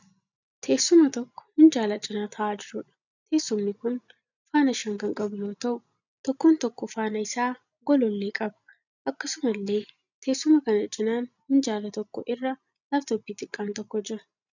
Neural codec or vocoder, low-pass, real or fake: none; 7.2 kHz; real